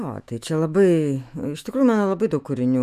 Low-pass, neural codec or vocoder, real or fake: 14.4 kHz; autoencoder, 48 kHz, 128 numbers a frame, DAC-VAE, trained on Japanese speech; fake